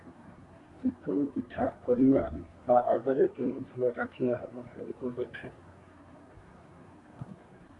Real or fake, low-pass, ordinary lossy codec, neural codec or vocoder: fake; 10.8 kHz; MP3, 96 kbps; codec, 24 kHz, 1 kbps, SNAC